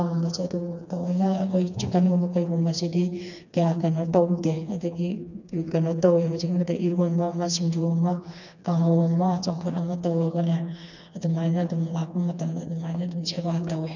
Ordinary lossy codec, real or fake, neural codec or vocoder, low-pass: none; fake; codec, 16 kHz, 2 kbps, FreqCodec, smaller model; 7.2 kHz